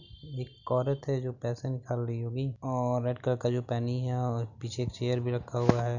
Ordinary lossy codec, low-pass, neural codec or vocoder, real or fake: none; 7.2 kHz; none; real